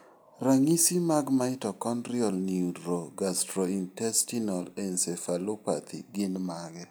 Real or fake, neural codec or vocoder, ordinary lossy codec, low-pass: real; none; none; none